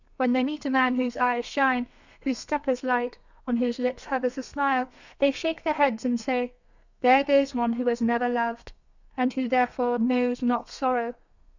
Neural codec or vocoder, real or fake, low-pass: codec, 32 kHz, 1.9 kbps, SNAC; fake; 7.2 kHz